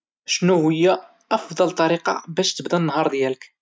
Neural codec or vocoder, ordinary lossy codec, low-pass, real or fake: none; none; none; real